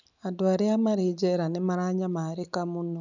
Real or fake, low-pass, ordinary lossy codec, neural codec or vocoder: real; 7.2 kHz; none; none